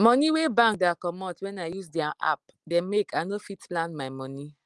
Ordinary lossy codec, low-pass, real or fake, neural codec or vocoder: Opus, 24 kbps; 10.8 kHz; real; none